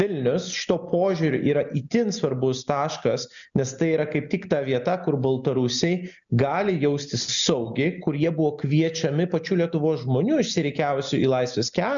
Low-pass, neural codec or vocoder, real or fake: 7.2 kHz; none; real